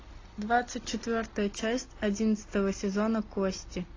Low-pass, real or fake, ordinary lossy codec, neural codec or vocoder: 7.2 kHz; real; AAC, 32 kbps; none